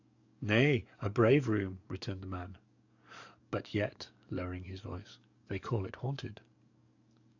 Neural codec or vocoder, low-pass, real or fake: codec, 44.1 kHz, 7.8 kbps, DAC; 7.2 kHz; fake